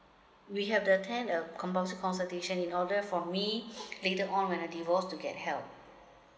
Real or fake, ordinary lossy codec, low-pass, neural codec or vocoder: real; none; none; none